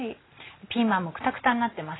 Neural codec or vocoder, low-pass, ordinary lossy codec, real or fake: none; 7.2 kHz; AAC, 16 kbps; real